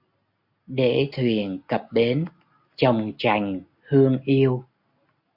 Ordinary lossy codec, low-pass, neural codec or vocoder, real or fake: AAC, 48 kbps; 5.4 kHz; none; real